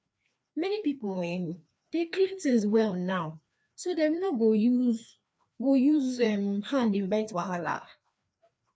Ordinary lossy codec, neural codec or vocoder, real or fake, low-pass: none; codec, 16 kHz, 2 kbps, FreqCodec, larger model; fake; none